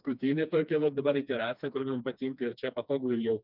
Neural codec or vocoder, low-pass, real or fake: codec, 16 kHz, 2 kbps, FreqCodec, smaller model; 5.4 kHz; fake